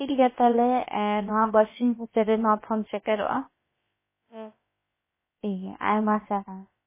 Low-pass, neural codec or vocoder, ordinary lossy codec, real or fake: 3.6 kHz; codec, 16 kHz, about 1 kbps, DyCAST, with the encoder's durations; MP3, 16 kbps; fake